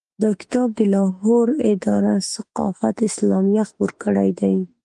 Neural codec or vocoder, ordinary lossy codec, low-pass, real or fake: autoencoder, 48 kHz, 32 numbers a frame, DAC-VAE, trained on Japanese speech; Opus, 32 kbps; 10.8 kHz; fake